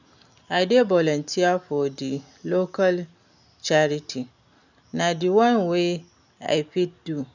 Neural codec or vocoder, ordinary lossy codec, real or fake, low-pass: none; none; real; 7.2 kHz